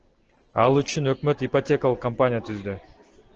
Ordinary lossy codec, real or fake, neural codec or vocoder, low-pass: Opus, 16 kbps; real; none; 7.2 kHz